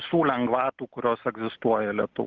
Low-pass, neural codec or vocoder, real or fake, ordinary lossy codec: 7.2 kHz; none; real; Opus, 16 kbps